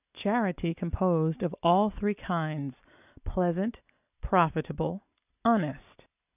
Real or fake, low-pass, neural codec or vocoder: real; 3.6 kHz; none